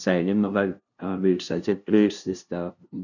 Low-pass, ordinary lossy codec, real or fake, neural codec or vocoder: 7.2 kHz; none; fake; codec, 16 kHz, 0.5 kbps, FunCodec, trained on LibriTTS, 25 frames a second